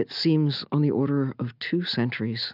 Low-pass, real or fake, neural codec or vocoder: 5.4 kHz; real; none